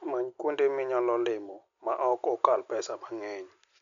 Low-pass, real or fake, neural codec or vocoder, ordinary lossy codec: 7.2 kHz; real; none; none